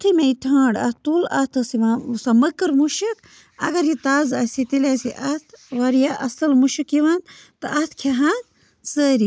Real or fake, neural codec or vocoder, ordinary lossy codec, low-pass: real; none; none; none